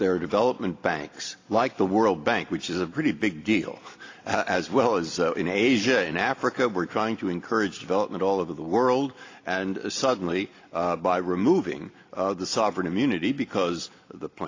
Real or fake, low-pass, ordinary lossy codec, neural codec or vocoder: real; 7.2 kHz; AAC, 32 kbps; none